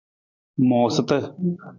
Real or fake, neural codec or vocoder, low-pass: fake; vocoder, 44.1 kHz, 128 mel bands every 512 samples, BigVGAN v2; 7.2 kHz